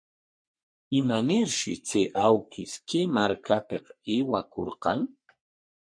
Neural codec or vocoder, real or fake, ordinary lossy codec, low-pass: codec, 44.1 kHz, 3.4 kbps, Pupu-Codec; fake; MP3, 48 kbps; 9.9 kHz